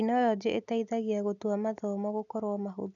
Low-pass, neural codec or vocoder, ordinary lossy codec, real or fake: 7.2 kHz; none; none; real